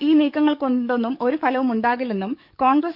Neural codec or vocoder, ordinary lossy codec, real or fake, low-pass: codec, 44.1 kHz, 7.8 kbps, DAC; none; fake; 5.4 kHz